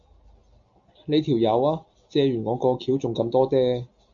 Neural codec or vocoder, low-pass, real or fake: none; 7.2 kHz; real